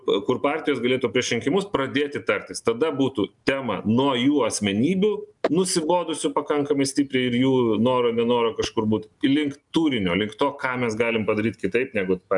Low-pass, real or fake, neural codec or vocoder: 10.8 kHz; real; none